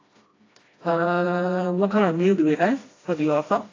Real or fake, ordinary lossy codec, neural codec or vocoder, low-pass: fake; AAC, 32 kbps; codec, 16 kHz, 1 kbps, FreqCodec, smaller model; 7.2 kHz